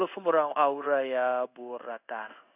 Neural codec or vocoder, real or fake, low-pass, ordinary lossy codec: codec, 16 kHz in and 24 kHz out, 1 kbps, XY-Tokenizer; fake; 3.6 kHz; none